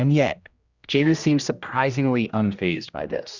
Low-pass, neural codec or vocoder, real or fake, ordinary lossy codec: 7.2 kHz; codec, 16 kHz, 1 kbps, X-Codec, HuBERT features, trained on general audio; fake; Opus, 64 kbps